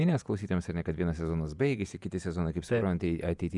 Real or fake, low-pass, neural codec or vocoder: fake; 10.8 kHz; vocoder, 48 kHz, 128 mel bands, Vocos